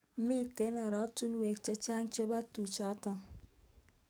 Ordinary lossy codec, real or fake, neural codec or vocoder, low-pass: none; fake; codec, 44.1 kHz, 7.8 kbps, DAC; none